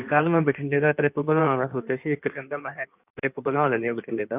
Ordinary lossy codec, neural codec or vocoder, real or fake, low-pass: none; codec, 16 kHz in and 24 kHz out, 1.1 kbps, FireRedTTS-2 codec; fake; 3.6 kHz